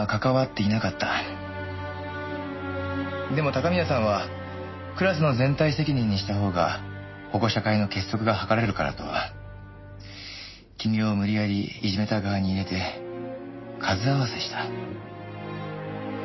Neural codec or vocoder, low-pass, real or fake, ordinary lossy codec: none; 7.2 kHz; real; MP3, 24 kbps